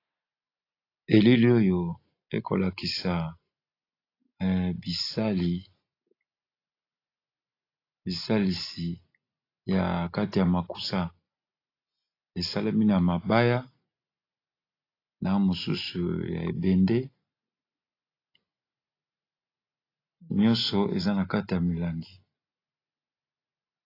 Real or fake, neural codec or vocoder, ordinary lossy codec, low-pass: real; none; AAC, 32 kbps; 5.4 kHz